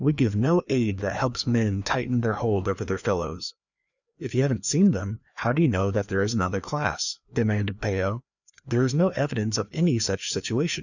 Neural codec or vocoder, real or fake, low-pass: codec, 16 kHz, 2 kbps, FreqCodec, larger model; fake; 7.2 kHz